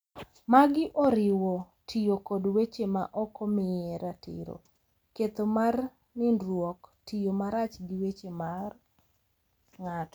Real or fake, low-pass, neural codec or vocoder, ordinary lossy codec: real; none; none; none